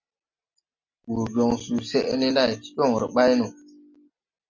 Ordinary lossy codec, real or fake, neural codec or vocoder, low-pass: MP3, 48 kbps; real; none; 7.2 kHz